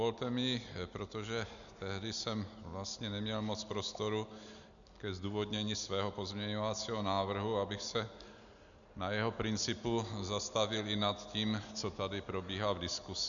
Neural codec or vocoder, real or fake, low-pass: none; real; 7.2 kHz